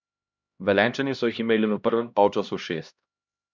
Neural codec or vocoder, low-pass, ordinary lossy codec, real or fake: codec, 16 kHz, 1 kbps, X-Codec, HuBERT features, trained on LibriSpeech; 7.2 kHz; none; fake